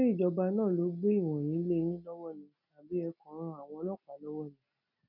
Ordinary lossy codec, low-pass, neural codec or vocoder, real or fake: none; 5.4 kHz; none; real